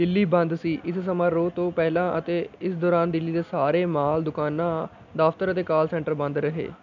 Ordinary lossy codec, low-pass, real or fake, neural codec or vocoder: none; 7.2 kHz; real; none